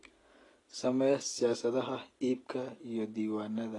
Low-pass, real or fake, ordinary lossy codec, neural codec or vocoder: 10.8 kHz; real; AAC, 32 kbps; none